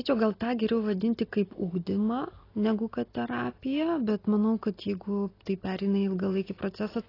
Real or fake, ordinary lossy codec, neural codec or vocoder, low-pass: real; AAC, 24 kbps; none; 5.4 kHz